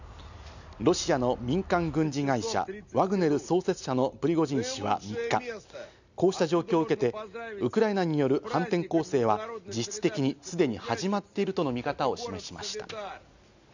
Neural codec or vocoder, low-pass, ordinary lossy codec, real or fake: none; 7.2 kHz; none; real